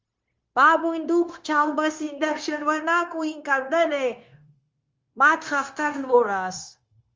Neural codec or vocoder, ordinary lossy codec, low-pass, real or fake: codec, 16 kHz, 0.9 kbps, LongCat-Audio-Codec; Opus, 32 kbps; 7.2 kHz; fake